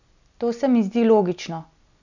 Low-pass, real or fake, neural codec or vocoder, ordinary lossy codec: 7.2 kHz; real; none; none